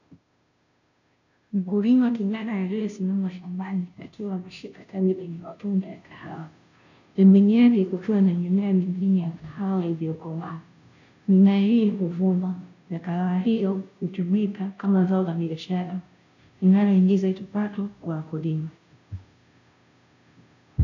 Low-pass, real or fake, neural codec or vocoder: 7.2 kHz; fake; codec, 16 kHz, 0.5 kbps, FunCodec, trained on Chinese and English, 25 frames a second